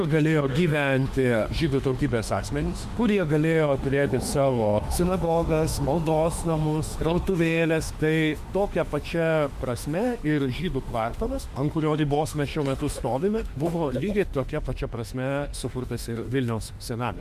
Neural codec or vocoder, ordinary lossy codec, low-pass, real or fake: autoencoder, 48 kHz, 32 numbers a frame, DAC-VAE, trained on Japanese speech; Opus, 64 kbps; 14.4 kHz; fake